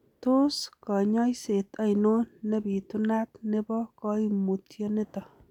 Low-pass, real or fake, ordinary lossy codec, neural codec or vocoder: 19.8 kHz; real; none; none